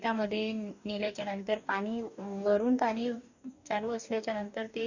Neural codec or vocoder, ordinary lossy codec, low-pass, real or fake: codec, 44.1 kHz, 2.6 kbps, DAC; none; 7.2 kHz; fake